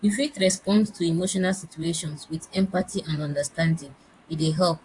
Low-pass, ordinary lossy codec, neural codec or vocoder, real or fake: 10.8 kHz; AAC, 64 kbps; vocoder, 44.1 kHz, 128 mel bands, Pupu-Vocoder; fake